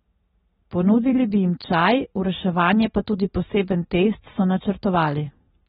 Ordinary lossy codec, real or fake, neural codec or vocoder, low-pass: AAC, 16 kbps; real; none; 7.2 kHz